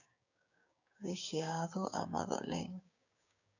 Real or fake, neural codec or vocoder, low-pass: fake; codec, 16 kHz, 6 kbps, DAC; 7.2 kHz